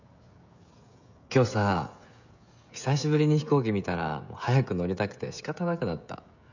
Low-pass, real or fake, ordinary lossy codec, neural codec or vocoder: 7.2 kHz; fake; none; codec, 16 kHz, 16 kbps, FreqCodec, smaller model